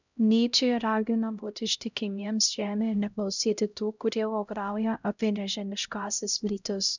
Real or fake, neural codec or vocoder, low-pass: fake; codec, 16 kHz, 0.5 kbps, X-Codec, HuBERT features, trained on LibriSpeech; 7.2 kHz